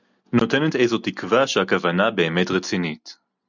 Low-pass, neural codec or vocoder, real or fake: 7.2 kHz; none; real